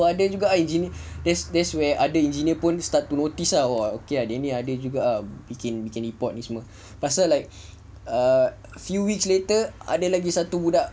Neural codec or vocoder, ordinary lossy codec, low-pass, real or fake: none; none; none; real